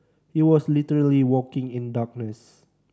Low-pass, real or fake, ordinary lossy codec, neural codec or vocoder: none; real; none; none